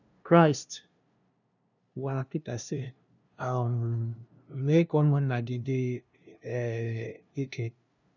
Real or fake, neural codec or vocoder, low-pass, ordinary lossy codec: fake; codec, 16 kHz, 0.5 kbps, FunCodec, trained on LibriTTS, 25 frames a second; 7.2 kHz; none